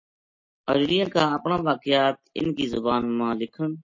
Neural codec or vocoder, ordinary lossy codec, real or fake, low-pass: none; MP3, 32 kbps; real; 7.2 kHz